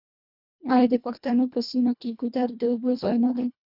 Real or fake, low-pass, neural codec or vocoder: fake; 5.4 kHz; codec, 24 kHz, 1.5 kbps, HILCodec